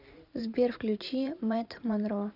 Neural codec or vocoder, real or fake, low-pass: vocoder, 44.1 kHz, 128 mel bands every 512 samples, BigVGAN v2; fake; 5.4 kHz